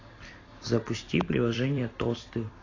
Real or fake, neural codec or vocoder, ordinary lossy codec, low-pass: fake; vocoder, 44.1 kHz, 128 mel bands every 256 samples, BigVGAN v2; AAC, 32 kbps; 7.2 kHz